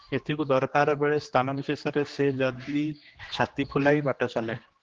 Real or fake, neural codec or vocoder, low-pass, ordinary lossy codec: fake; codec, 16 kHz, 2 kbps, X-Codec, HuBERT features, trained on general audio; 7.2 kHz; Opus, 16 kbps